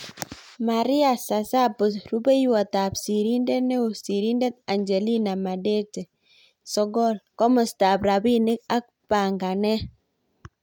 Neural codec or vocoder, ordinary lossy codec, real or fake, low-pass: none; MP3, 96 kbps; real; 19.8 kHz